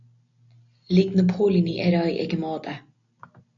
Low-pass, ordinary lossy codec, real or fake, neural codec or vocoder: 7.2 kHz; AAC, 64 kbps; real; none